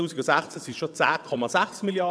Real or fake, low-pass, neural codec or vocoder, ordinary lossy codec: fake; none; vocoder, 22.05 kHz, 80 mel bands, WaveNeXt; none